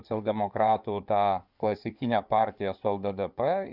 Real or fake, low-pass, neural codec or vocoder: fake; 5.4 kHz; codec, 16 kHz in and 24 kHz out, 2.2 kbps, FireRedTTS-2 codec